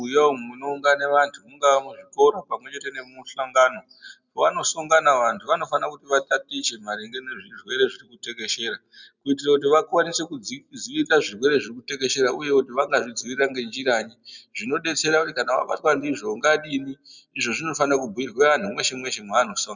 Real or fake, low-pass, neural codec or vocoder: real; 7.2 kHz; none